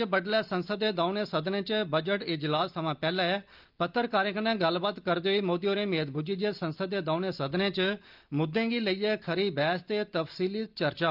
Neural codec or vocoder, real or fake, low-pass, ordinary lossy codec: none; real; 5.4 kHz; Opus, 32 kbps